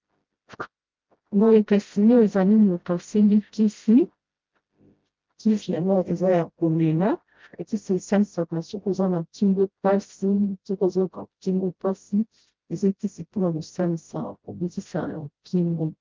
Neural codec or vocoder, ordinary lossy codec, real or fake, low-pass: codec, 16 kHz, 0.5 kbps, FreqCodec, smaller model; Opus, 24 kbps; fake; 7.2 kHz